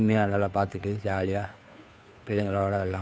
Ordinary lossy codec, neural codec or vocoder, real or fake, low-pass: none; codec, 16 kHz, 2 kbps, FunCodec, trained on Chinese and English, 25 frames a second; fake; none